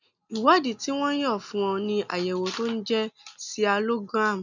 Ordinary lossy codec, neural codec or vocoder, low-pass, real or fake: none; none; 7.2 kHz; real